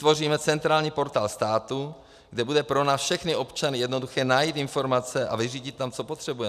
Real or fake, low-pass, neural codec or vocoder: real; 14.4 kHz; none